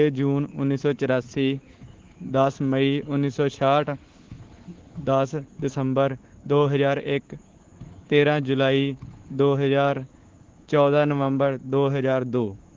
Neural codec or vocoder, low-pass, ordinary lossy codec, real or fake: codec, 24 kHz, 3.1 kbps, DualCodec; 7.2 kHz; Opus, 16 kbps; fake